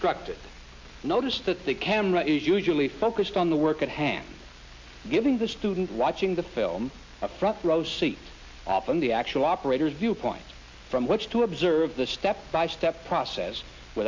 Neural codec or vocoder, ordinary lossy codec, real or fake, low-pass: none; MP3, 48 kbps; real; 7.2 kHz